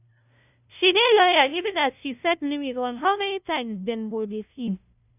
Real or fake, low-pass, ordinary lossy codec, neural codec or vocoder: fake; 3.6 kHz; none; codec, 16 kHz, 0.5 kbps, FunCodec, trained on LibriTTS, 25 frames a second